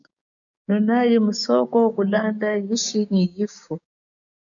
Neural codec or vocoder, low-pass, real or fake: codec, 16 kHz, 6 kbps, DAC; 7.2 kHz; fake